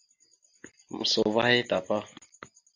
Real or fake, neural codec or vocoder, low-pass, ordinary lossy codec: real; none; 7.2 kHz; AAC, 48 kbps